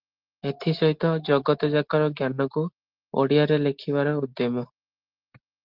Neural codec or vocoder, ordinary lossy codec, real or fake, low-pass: none; Opus, 16 kbps; real; 5.4 kHz